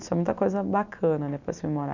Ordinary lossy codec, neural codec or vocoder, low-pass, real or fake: none; none; 7.2 kHz; real